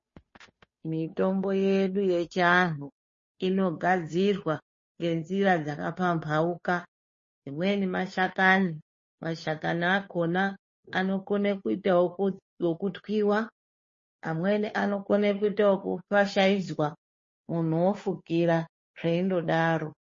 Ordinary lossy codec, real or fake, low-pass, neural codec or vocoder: MP3, 32 kbps; fake; 7.2 kHz; codec, 16 kHz, 2 kbps, FunCodec, trained on Chinese and English, 25 frames a second